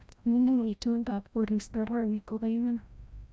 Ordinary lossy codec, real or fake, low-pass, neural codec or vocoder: none; fake; none; codec, 16 kHz, 0.5 kbps, FreqCodec, larger model